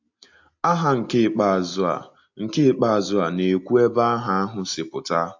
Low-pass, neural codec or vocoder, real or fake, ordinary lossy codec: 7.2 kHz; none; real; AAC, 48 kbps